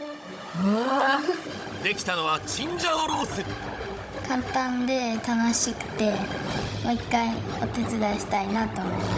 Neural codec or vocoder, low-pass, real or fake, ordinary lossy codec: codec, 16 kHz, 16 kbps, FunCodec, trained on Chinese and English, 50 frames a second; none; fake; none